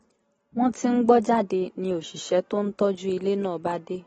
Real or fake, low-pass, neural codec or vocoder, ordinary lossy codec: real; 19.8 kHz; none; AAC, 24 kbps